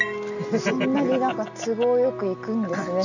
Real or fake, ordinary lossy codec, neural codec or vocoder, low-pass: real; none; none; 7.2 kHz